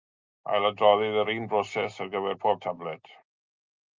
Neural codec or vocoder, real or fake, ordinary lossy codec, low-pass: none; real; Opus, 24 kbps; 7.2 kHz